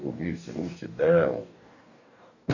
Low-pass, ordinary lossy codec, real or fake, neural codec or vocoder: 7.2 kHz; none; fake; codec, 44.1 kHz, 2.6 kbps, DAC